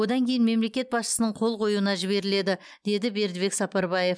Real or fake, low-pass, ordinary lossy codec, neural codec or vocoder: real; none; none; none